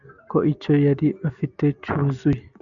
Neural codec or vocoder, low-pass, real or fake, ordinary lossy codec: none; 7.2 kHz; real; MP3, 96 kbps